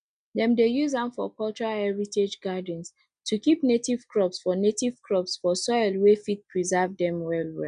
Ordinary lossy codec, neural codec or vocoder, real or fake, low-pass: none; none; real; 9.9 kHz